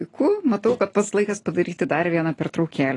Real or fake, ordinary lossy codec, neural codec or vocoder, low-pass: real; AAC, 32 kbps; none; 10.8 kHz